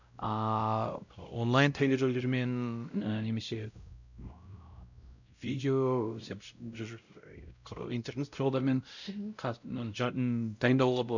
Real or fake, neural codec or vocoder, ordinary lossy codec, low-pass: fake; codec, 16 kHz, 0.5 kbps, X-Codec, WavLM features, trained on Multilingual LibriSpeech; none; 7.2 kHz